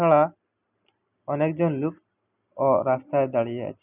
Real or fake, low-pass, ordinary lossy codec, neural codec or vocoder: real; 3.6 kHz; none; none